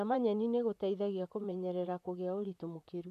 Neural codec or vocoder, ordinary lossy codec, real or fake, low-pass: vocoder, 44.1 kHz, 128 mel bands, Pupu-Vocoder; none; fake; 14.4 kHz